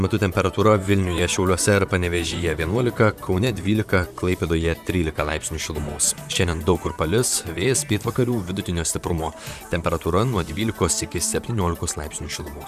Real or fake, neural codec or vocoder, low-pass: fake; vocoder, 44.1 kHz, 128 mel bands, Pupu-Vocoder; 14.4 kHz